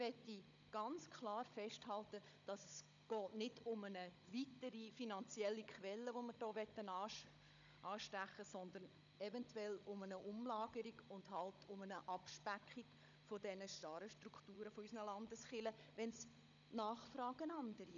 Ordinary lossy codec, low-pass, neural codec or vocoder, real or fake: none; 7.2 kHz; codec, 16 kHz, 16 kbps, FunCodec, trained on Chinese and English, 50 frames a second; fake